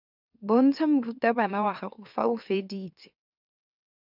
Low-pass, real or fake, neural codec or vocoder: 5.4 kHz; fake; autoencoder, 44.1 kHz, a latent of 192 numbers a frame, MeloTTS